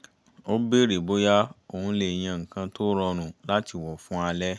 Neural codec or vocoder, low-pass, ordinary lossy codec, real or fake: none; none; none; real